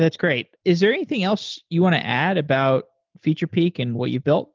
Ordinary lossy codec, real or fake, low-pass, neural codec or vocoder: Opus, 24 kbps; real; 7.2 kHz; none